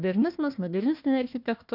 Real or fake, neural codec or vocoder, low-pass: fake; codec, 16 kHz, 1 kbps, FunCodec, trained on Chinese and English, 50 frames a second; 5.4 kHz